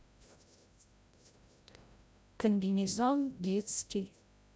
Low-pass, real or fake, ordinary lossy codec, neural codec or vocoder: none; fake; none; codec, 16 kHz, 0.5 kbps, FreqCodec, larger model